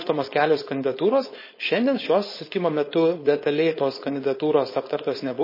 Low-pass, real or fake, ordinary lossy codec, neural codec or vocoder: 5.4 kHz; fake; MP3, 24 kbps; codec, 16 kHz, 4.8 kbps, FACodec